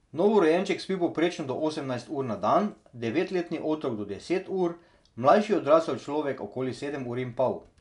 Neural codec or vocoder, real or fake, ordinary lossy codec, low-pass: none; real; none; 10.8 kHz